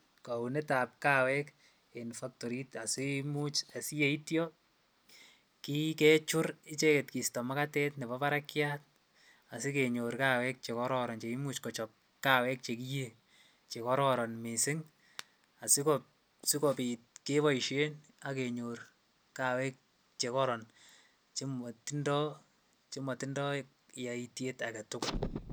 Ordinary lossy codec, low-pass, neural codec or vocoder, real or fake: none; none; none; real